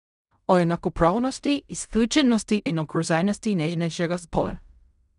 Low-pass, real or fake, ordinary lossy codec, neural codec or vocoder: 10.8 kHz; fake; none; codec, 16 kHz in and 24 kHz out, 0.4 kbps, LongCat-Audio-Codec, fine tuned four codebook decoder